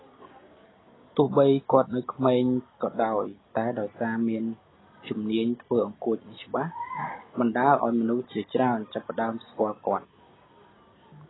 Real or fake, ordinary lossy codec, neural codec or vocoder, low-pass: real; AAC, 16 kbps; none; 7.2 kHz